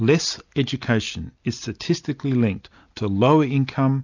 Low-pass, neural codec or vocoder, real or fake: 7.2 kHz; none; real